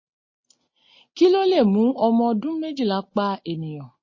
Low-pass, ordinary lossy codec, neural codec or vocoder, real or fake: 7.2 kHz; MP3, 32 kbps; none; real